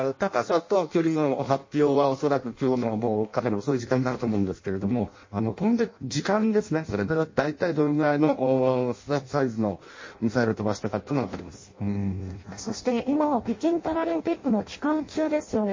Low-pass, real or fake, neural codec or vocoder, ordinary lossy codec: 7.2 kHz; fake; codec, 16 kHz in and 24 kHz out, 0.6 kbps, FireRedTTS-2 codec; MP3, 32 kbps